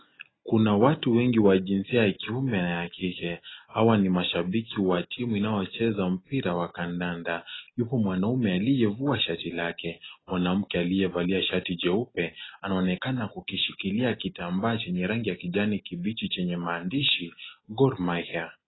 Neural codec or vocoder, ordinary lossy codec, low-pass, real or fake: none; AAC, 16 kbps; 7.2 kHz; real